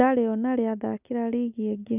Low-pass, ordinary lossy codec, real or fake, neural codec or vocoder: 3.6 kHz; none; real; none